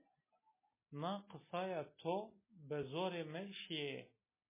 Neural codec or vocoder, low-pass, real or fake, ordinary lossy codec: none; 3.6 kHz; real; MP3, 16 kbps